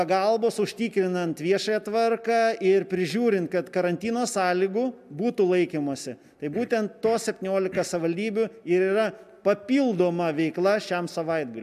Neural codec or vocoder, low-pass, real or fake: none; 14.4 kHz; real